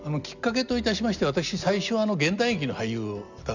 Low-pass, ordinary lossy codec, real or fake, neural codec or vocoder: 7.2 kHz; none; real; none